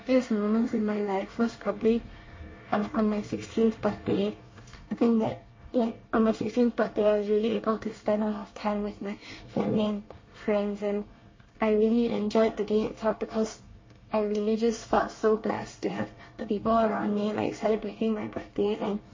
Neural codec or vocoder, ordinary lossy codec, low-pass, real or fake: codec, 24 kHz, 1 kbps, SNAC; MP3, 32 kbps; 7.2 kHz; fake